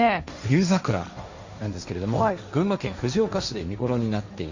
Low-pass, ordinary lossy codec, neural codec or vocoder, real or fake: 7.2 kHz; Opus, 64 kbps; codec, 16 kHz, 1.1 kbps, Voila-Tokenizer; fake